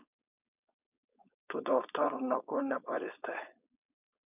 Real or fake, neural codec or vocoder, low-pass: fake; codec, 16 kHz, 4.8 kbps, FACodec; 3.6 kHz